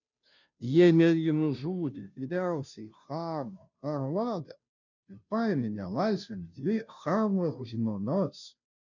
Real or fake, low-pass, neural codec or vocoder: fake; 7.2 kHz; codec, 16 kHz, 0.5 kbps, FunCodec, trained on Chinese and English, 25 frames a second